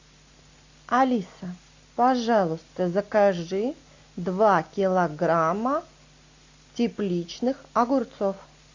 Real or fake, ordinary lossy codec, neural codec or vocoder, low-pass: real; AAC, 48 kbps; none; 7.2 kHz